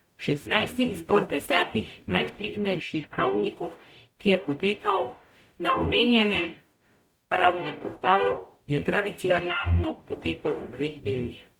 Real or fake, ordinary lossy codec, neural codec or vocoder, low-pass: fake; Opus, 64 kbps; codec, 44.1 kHz, 0.9 kbps, DAC; 19.8 kHz